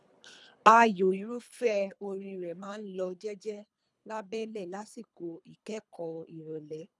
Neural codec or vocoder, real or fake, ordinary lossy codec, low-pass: codec, 24 kHz, 3 kbps, HILCodec; fake; none; none